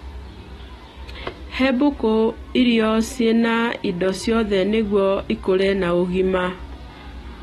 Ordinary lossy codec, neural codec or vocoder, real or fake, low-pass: AAC, 32 kbps; none; real; 19.8 kHz